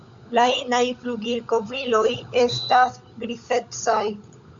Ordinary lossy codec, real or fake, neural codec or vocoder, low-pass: MP3, 64 kbps; fake; codec, 16 kHz, 16 kbps, FunCodec, trained on LibriTTS, 50 frames a second; 7.2 kHz